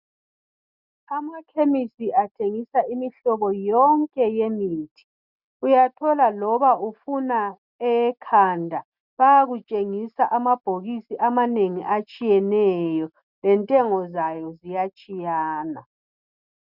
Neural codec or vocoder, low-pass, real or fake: none; 5.4 kHz; real